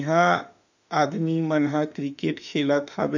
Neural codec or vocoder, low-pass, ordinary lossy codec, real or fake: autoencoder, 48 kHz, 32 numbers a frame, DAC-VAE, trained on Japanese speech; 7.2 kHz; none; fake